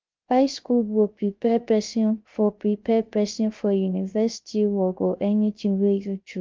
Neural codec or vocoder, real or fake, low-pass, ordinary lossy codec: codec, 16 kHz, 0.3 kbps, FocalCodec; fake; 7.2 kHz; Opus, 24 kbps